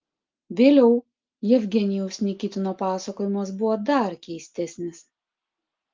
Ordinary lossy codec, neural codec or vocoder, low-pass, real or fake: Opus, 24 kbps; none; 7.2 kHz; real